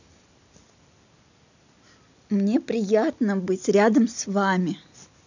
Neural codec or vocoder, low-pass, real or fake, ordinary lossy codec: none; 7.2 kHz; real; none